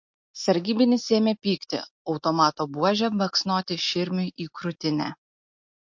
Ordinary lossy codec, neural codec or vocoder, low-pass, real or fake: MP3, 48 kbps; none; 7.2 kHz; real